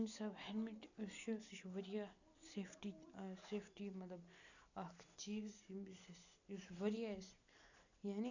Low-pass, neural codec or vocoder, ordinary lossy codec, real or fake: 7.2 kHz; none; none; real